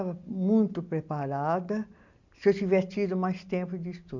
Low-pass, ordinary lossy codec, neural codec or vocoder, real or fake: 7.2 kHz; none; none; real